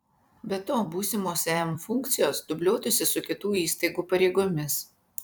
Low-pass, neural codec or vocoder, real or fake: 19.8 kHz; vocoder, 44.1 kHz, 128 mel bands every 256 samples, BigVGAN v2; fake